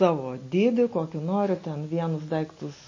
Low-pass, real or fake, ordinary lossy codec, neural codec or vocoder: 7.2 kHz; real; MP3, 32 kbps; none